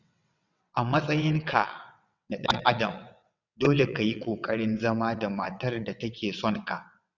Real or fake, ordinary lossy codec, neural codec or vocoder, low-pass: fake; none; vocoder, 22.05 kHz, 80 mel bands, WaveNeXt; 7.2 kHz